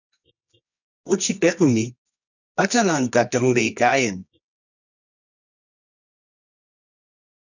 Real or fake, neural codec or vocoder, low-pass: fake; codec, 24 kHz, 0.9 kbps, WavTokenizer, medium music audio release; 7.2 kHz